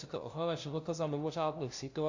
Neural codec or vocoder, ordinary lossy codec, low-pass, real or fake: codec, 16 kHz, 0.5 kbps, FunCodec, trained on LibriTTS, 25 frames a second; AAC, 48 kbps; 7.2 kHz; fake